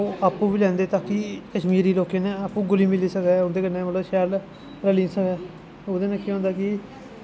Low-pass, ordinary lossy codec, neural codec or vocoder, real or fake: none; none; none; real